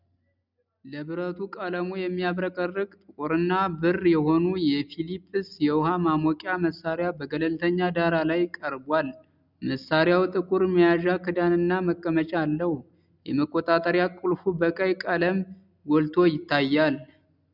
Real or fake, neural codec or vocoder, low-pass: real; none; 5.4 kHz